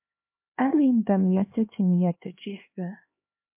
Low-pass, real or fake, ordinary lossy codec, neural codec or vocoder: 3.6 kHz; fake; MP3, 32 kbps; codec, 16 kHz, 2 kbps, X-Codec, HuBERT features, trained on LibriSpeech